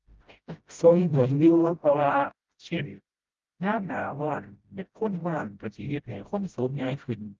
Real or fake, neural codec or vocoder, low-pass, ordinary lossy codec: fake; codec, 16 kHz, 0.5 kbps, FreqCodec, smaller model; 7.2 kHz; Opus, 32 kbps